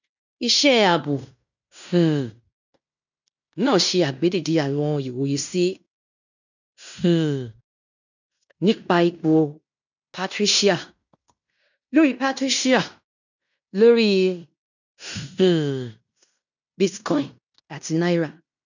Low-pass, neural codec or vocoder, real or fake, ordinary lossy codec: 7.2 kHz; codec, 16 kHz in and 24 kHz out, 0.9 kbps, LongCat-Audio-Codec, fine tuned four codebook decoder; fake; none